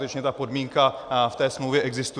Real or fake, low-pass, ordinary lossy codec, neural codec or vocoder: real; 9.9 kHz; Opus, 64 kbps; none